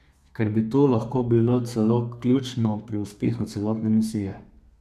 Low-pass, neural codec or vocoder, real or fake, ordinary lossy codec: 14.4 kHz; codec, 32 kHz, 1.9 kbps, SNAC; fake; none